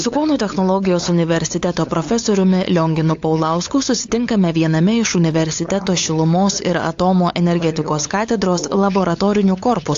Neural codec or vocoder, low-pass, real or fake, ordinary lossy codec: codec, 16 kHz, 8 kbps, FunCodec, trained on LibriTTS, 25 frames a second; 7.2 kHz; fake; AAC, 48 kbps